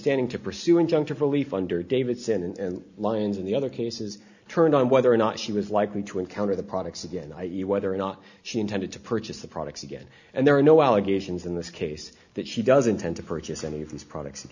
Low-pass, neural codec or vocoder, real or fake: 7.2 kHz; none; real